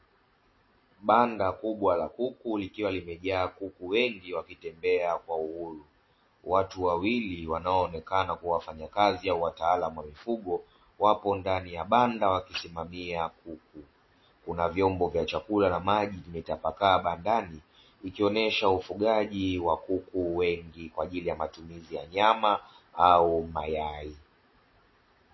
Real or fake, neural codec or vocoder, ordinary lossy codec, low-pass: real; none; MP3, 24 kbps; 7.2 kHz